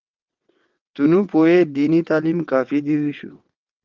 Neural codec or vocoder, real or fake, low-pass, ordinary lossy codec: vocoder, 22.05 kHz, 80 mel bands, WaveNeXt; fake; 7.2 kHz; Opus, 32 kbps